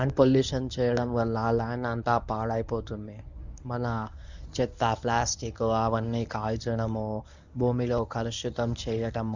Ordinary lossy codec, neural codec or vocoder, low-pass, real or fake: none; codec, 24 kHz, 0.9 kbps, WavTokenizer, medium speech release version 1; 7.2 kHz; fake